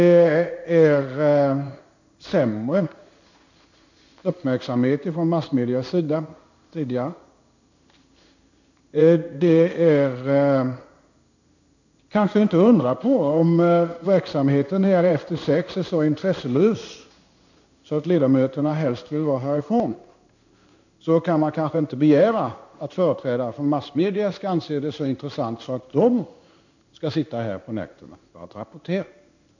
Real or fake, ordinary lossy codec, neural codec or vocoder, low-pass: fake; none; codec, 16 kHz in and 24 kHz out, 1 kbps, XY-Tokenizer; 7.2 kHz